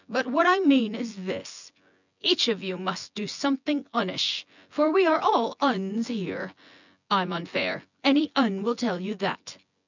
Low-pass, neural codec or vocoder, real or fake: 7.2 kHz; vocoder, 24 kHz, 100 mel bands, Vocos; fake